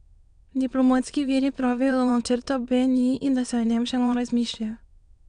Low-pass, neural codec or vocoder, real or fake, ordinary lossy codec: 9.9 kHz; autoencoder, 22.05 kHz, a latent of 192 numbers a frame, VITS, trained on many speakers; fake; none